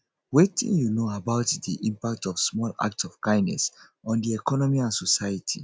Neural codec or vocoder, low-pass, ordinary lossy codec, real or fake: none; none; none; real